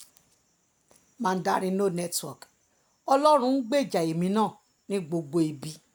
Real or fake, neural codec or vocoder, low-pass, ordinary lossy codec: real; none; none; none